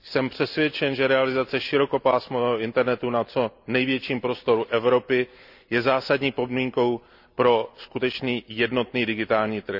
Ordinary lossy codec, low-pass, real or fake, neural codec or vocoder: none; 5.4 kHz; real; none